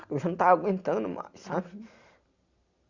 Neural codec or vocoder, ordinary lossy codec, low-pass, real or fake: none; Opus, 64 kbps; 7.2 kHz; real